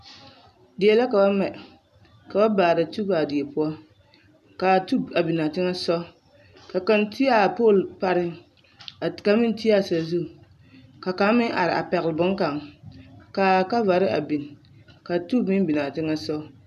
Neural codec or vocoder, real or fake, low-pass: none; real; 14.4 kHz